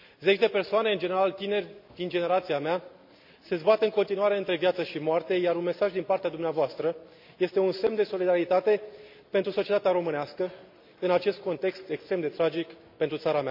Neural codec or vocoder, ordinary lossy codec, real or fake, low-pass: none; MP3, 48 kbps; real; 5.4 kHz